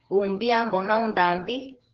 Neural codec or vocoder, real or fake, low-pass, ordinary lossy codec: codec, 16 kHz, 2 kbps, FreqCodec, larger model; fake; 7.2 kHz; Opus, 16 kbps